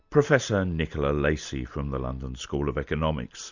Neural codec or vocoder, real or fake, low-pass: none; real; 7.2 kHz